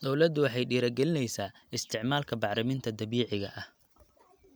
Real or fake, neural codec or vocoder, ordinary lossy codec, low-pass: real; none; none; none